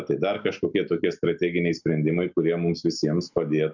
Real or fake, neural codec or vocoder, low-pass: real; none; 7.2 kHz